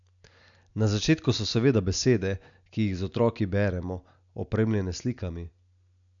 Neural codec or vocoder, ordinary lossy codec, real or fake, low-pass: none; none; real; 7.2 kHz